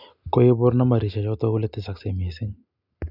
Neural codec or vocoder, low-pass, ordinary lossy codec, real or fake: none; 5.4 kHz; none; real